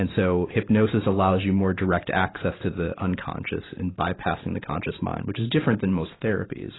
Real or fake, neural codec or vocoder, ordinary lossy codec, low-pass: fake; vocoder, 44.1 kHz, 128 mel bands every 512 samples, BigVGAN v2; AAC, 16 kbps; 7.2 kHz